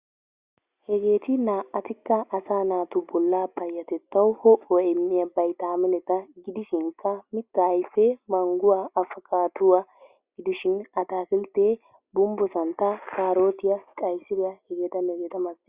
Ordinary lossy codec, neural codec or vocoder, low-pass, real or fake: Opus, 64 kbps; none; 3.6 kHz; real